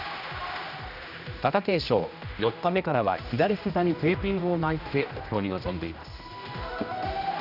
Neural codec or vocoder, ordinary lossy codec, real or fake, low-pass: codec, 16 kHz, 1 kbps, X-Codec, HuBERT features, trained on general audio; none; fake; 5.4 kHz